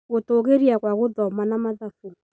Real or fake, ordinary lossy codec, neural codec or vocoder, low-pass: real; none; none; none